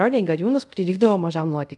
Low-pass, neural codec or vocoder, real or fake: 10.8 kHz; codec, 24 kHz, 0.5 kbps, DualCodec; fake